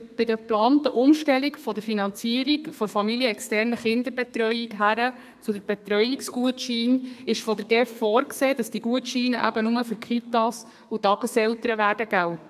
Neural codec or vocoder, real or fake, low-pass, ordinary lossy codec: codec, 32 kHz, 1.9 kbps, SNAC; fake; 14.4 kHz; AAC, 96 kbps